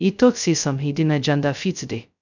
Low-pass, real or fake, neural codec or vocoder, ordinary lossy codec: 7.2 kHz; fake; codec, 16 kHz, 0.2 kbps, FocalCodec; none